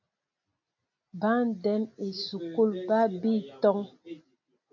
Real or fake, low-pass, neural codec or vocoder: real; 7.2 kHz; none